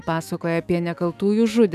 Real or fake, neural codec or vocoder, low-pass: fake; autoencoder, 48 kHz, 128 numbers a frame, DAC-VAE, trained on Japanese speech; 14.4 kHz